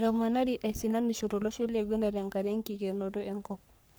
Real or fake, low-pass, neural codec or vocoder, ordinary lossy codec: fake; none; codec, 44.1 kHz, 3.4 kbps, Pupu-Codec; none